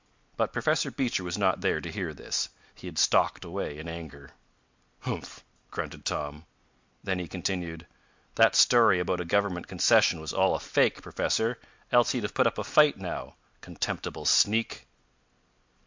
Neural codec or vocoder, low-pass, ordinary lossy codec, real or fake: none; 7.2 kHz; MP3, 64 kbps; real